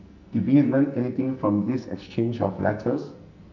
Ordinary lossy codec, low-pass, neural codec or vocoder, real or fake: none; 7.2 kHz; codec, 44.1 kHz, 2.6 kbps, SNAC; fake